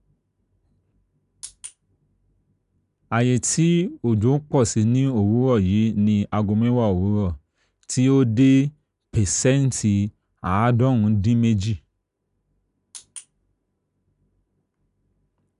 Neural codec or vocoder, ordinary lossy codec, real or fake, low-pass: none; none; real; 10.8 kHz